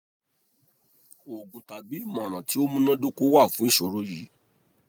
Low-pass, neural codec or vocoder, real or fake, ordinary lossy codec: none; none; real; none